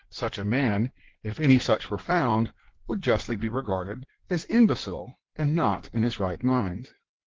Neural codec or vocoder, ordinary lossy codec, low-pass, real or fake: codec, 16 kHz in and 24 kHz out, 1.1 kbps, FireRedTTS-2 codec; Opus, 16 kbps; 7.2 kHz; fake